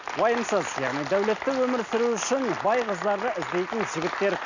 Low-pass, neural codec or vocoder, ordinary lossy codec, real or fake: 7.2 kHz; none; none; real